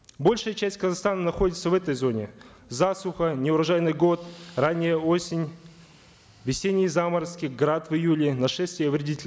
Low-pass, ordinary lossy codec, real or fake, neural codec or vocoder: none; none; real; none